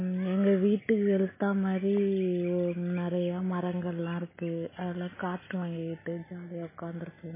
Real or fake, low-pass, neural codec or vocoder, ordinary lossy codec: real; 3.6 kHz; none; MP3, 16 kbps